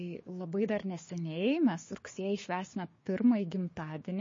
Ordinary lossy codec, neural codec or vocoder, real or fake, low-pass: MP3, 32 kbps; codec, 16 kHz, 6 kbps, DAC; fake; 7.2 kHz